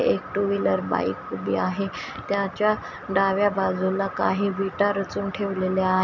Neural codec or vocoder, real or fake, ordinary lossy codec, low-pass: none; real; none; 7.2 kHz